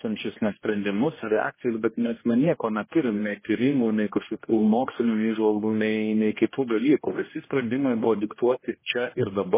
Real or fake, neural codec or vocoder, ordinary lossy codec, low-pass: fake; codec, 16 kHz, 1 kbps, X-Codec, HuBERT features, trained on general audio; MP3, 16 kbps; 3.6 kHz